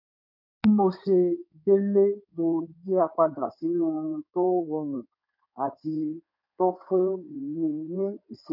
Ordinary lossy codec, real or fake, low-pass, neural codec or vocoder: none; fake; 5.4 kHz; codec, 16 kHz in and 24 kHz out, 2.2 kbps, FireRedTTS-2 codec